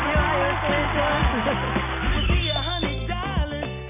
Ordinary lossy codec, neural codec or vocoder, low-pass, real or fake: none; none; 3.6 kHz; real